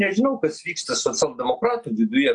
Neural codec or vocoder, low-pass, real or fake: none; 9.9 kHz; real